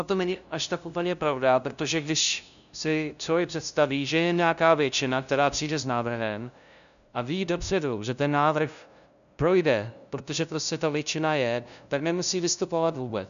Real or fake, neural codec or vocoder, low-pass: fake; codec, 16 kHz, 0.5 kbps, FunCodec, trained on LibriTTS, 25 frames a second; 7.2 kHz